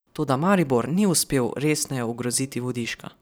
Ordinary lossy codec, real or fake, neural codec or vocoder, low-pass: none; fake; vocoder, 44.1 kHz, 128 mel bands every 512 samples, BigVGAN v2; none